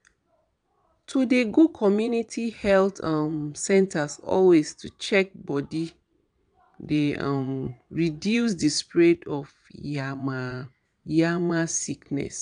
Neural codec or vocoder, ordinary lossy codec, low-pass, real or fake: vocoder, 22.05 kHz, 80 mel bands, Vocos; none; 9.9 kHz; fake